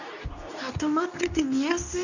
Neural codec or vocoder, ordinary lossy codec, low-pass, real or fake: codec, 24 kHz, 0.9 kbps, WavTokenizer, medium speech release version 2; none; 7.2 kHz; fake